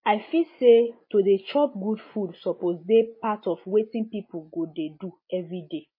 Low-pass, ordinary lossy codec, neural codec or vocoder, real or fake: 5.4 kHz; MP3, 24 kbps; none; real